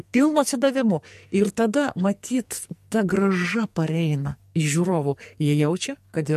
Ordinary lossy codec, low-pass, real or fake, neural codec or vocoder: MP3, 64 kbps; 14.4 kHz; fake; codec, 44.1 kHz, 2.6 kbps, SNAC